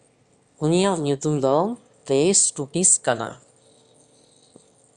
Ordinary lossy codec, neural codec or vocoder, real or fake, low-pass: Opus, 64 kbps; autoencoder, 22.05 kHz, a latent of 192 numbers a frame, VITS, trained on one speaker; fake; 9.9 kHz